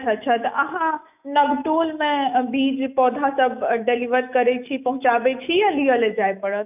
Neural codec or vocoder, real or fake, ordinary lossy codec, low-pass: autoencoder, 48 kHz, 128 numbers a frame, DAC-VAE, trained on Japanese speech; fake; none; 3.6 kHz